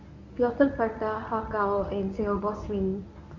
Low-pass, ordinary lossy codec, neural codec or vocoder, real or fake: 7.2 kHz; Opus, 64 kbps; codec, 24 kHz, 0.9 kbps, WavTokenizer, medium speech release version 1; fake